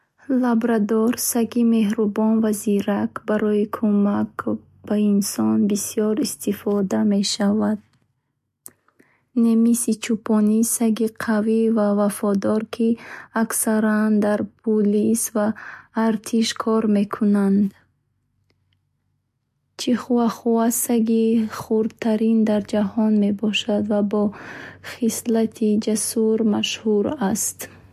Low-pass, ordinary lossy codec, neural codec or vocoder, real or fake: 14.4 kHz; MP3, 64 kbps; none; real